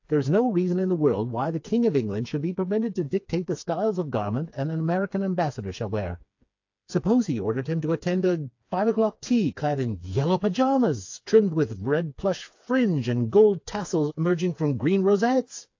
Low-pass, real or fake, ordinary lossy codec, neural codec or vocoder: 7.2 kHz; fake; AAC, 48 kbps; codec, 16 kHz, 4 kbps, FreqCodec, smaller model